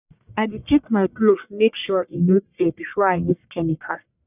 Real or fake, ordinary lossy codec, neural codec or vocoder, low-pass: fake; none; codec, 44.1 kHz, 1.7 kbps, Pupu-Codec; 3.6 kHz